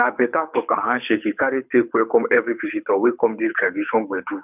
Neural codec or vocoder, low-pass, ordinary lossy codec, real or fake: codec, 24 kHz, 6 kbps, HILCodec; 3.6 kHz; none; fake